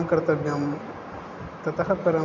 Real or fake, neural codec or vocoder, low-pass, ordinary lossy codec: real; none; 7.2 kHz; none